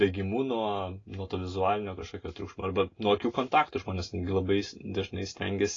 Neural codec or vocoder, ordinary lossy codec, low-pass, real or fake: none; AAC, 32 kbps; 7.2 kHz; real